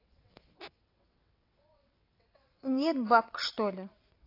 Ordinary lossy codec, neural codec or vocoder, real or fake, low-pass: AAC, 32 kbps; vocoder, 22.05 kHz, 80 mel bands, WaveNeXt; fake; 5.4 kHz